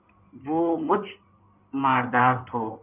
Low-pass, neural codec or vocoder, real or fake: 3.6 kHz; codec, 16 kHz in and 24 kHz out, 2.2 kbps, FireRedTTS-2 codec; fake